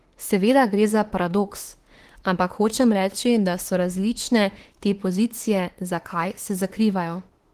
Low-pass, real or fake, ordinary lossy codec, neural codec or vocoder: 14.4 kHz; fake; Opus, 16 kbps; autoencoder, 48 kHz, 32 numbers a frame, DAC-VAE, trained on Japanese speech